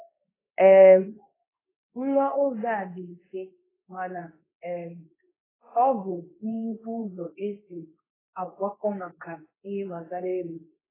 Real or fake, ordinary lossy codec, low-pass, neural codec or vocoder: fake; AAC, 16 kbps; 3.6 kHz; codec, 24 kHz, 0.9 kbps, WavTokenizer, medium speech release version 2